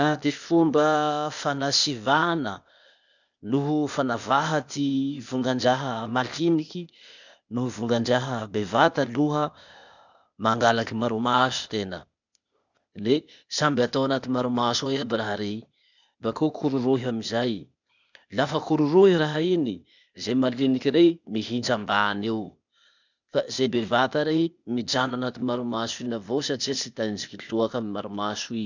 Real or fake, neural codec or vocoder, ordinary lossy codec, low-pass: fake; codec, 16 kHz, 0.8 kbps, ZipCodec; none; 7.2 kHz